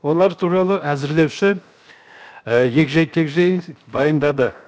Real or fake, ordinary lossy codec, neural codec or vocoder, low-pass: fake; none; codec, 16 kHz, 0.7 kbps, FocalCodec; none